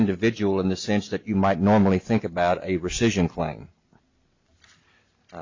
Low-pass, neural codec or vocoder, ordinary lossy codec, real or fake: 7.2 kHz; none; MP3, 64 kbps; real